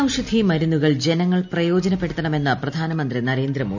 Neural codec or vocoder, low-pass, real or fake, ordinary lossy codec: none; 7.2 kHz; real; none